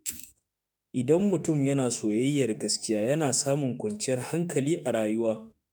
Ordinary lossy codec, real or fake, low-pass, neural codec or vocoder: none; fake; none; autoencoder, 48 kHz, 32 numbers a frame, DAC-VAE, trained on Japanese speech